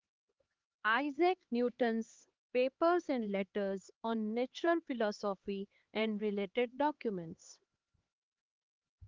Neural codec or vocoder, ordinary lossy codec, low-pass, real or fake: codec, 16 kHz, 4 kbps, X-Codec, HuBERT features, trained on LibriSpeech; Opus, 16 kbps; 7.2 kHz; fake